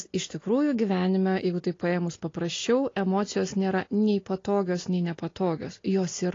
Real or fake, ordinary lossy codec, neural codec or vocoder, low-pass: real; AAC, 32 kbps; none; 7.2 kHz